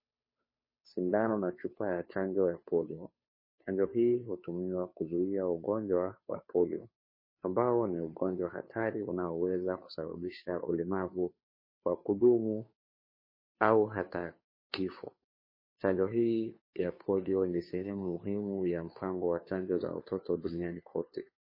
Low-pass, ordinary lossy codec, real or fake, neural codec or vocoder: 5.4 kHz; MP3, 24 kbps; fake; codec, 16 kHz, 2 kbps, FunCodec, trained on Chinese and English, 25 frames a second